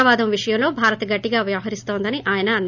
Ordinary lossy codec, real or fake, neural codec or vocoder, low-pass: none; real; none; 7.2 kHz